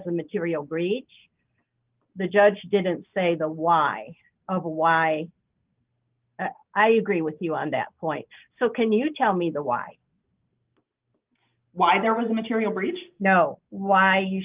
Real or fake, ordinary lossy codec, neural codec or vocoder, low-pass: real; Opus, 24 kbps; none; 3.6 kHz